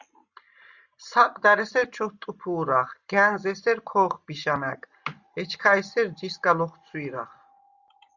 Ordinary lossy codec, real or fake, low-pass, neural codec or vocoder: Opus, 64 kbps; real; 7.2 kHz; none